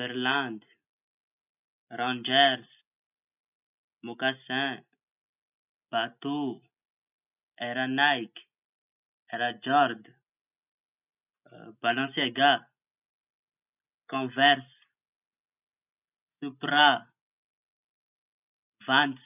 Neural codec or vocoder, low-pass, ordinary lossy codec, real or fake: none; 3.6 kHz; none; real